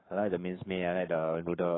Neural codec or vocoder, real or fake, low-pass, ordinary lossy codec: codec, 44.1 kHz, 7.8 kbps, Pupu-Codec; fake; 7.2 kHz; AAC, 16 kbps